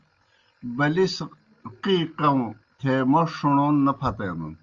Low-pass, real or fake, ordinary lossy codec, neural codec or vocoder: 7.2 kHz; real; Opus, 32 kbps; none